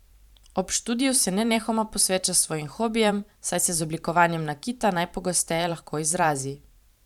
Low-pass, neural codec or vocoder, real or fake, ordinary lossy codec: 19.8 kHz; vocoder, 44.1 kHz, 128 mel bands every 512 samples, BigVGAN v2; fake; none